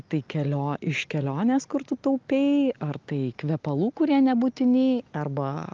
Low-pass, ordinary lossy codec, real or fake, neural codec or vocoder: 7.2 kHz; Opus, 32 kbps; real; none